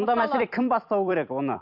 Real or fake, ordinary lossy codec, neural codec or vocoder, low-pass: fake; MP3, 48 kbps; vocoder, 44.1 kHz, 128 mel bands every 256 samples, BigVGAN v2; 5.4 kHz